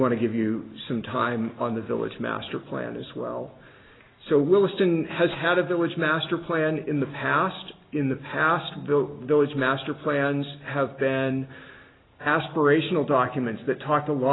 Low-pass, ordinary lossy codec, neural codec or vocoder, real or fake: 7.2 kHz; AAC, 16 kbps; none; real